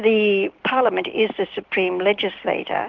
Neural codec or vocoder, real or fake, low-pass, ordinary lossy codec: none; real; 7.2 kHz; Opus, 16 kbps